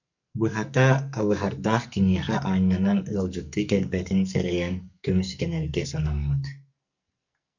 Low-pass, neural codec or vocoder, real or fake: 7.2 kHz; codec, 44.1 kHz, 2.6 kbps, SNAC; fake